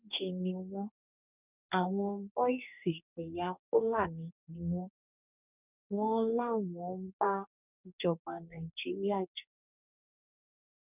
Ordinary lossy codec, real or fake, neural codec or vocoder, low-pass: none; fake; codec, 44.1 kHz, 2.6 kbps, DAC; 3.6 kHz